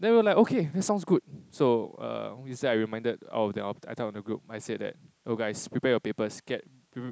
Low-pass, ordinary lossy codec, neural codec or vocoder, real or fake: none; none; none; real